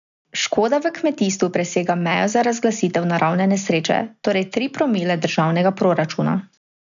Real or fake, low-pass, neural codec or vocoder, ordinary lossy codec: real; 7.2 kHz; none; none